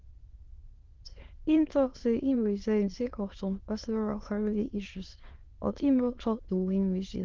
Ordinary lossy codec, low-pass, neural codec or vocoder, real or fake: Opus, 16 kbps; 7.2 kHz; autoencoder, 22.05 kHz, a latent of 192 numbers a frame, VITS, trained on many speakers; fake